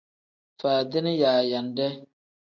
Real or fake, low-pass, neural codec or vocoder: real; 7.2 kHz; none